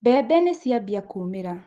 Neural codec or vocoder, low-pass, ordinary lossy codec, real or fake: none; 14.4 kHz; Opus, 16 kbps; real